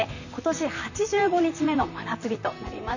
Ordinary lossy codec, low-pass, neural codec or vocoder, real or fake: none; 7.2 kHz; vocoder, 44.1 kHz, 128 mel bands, Pupu-Vocoder; fake